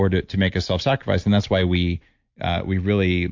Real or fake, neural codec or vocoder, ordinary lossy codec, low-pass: real; none; MP3, 48 kbps; 7.2 kHz